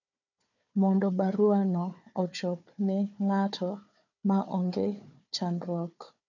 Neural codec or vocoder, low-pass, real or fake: codec, 16 kHz, 4 kbps, FunCodec, trained on Chinese and English, 50 frames a second; 7.2 kHz; fake